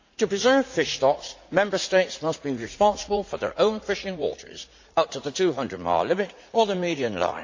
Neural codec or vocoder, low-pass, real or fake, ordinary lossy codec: vocoder, 44.1 kHz, 80 mel bands, Vocos; 7.2 kHz; fake; AAC, 48 kbps